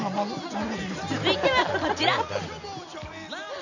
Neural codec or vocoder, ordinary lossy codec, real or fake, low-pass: none; none; real; 7.2 kHz